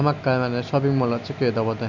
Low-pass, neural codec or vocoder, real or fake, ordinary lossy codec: 7.2 kHz; none; real; none